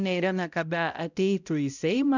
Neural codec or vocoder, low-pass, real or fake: codec, 16 kHz, 0.5 kbps, X-Codec, HuBERT features, trained on balanced general audio; 7.2 kHz; fake